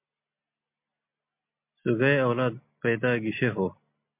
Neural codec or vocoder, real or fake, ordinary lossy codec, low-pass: none; real; MP3, 32 kbps; 3.6 kHz